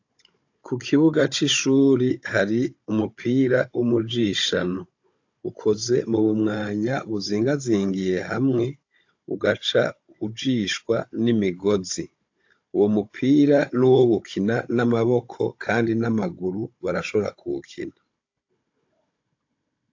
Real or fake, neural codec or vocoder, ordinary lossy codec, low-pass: fake; codec, 16 kHz, 16 kbps, FunCodec, trained on Chinese and English, 50 frames a second; AAC, 48 kbps; 7.2 kHz